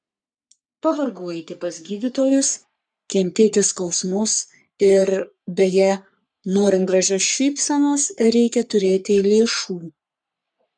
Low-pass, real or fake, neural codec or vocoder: 9.9 kHz; fake; codec, 44.1 kHz, 3.4 kbps, Pupu-Codec